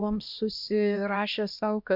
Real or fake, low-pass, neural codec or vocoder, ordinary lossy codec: fake; 5.4 kHz; codec, 16 kHz, 1 kbps, X-Codec, HuBERT features, trained on LibriSpeech; MP3, 48 kbps